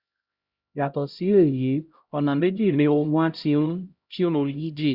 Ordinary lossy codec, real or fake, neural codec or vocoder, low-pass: Opus, 64 kbps; fake; codec, 16 kHz, 0.5 kbps, X-Codec, HuBERT features, trained on LibriSpeech; 5.4 kHz